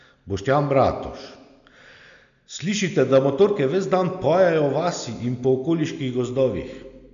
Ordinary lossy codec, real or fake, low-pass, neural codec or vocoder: none; real; 7.2 kHz; none